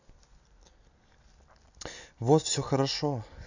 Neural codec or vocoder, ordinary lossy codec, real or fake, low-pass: none; none; real; 7.2 kHz